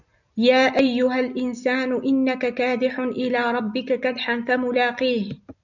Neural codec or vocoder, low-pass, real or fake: none; 7.2 kHz; real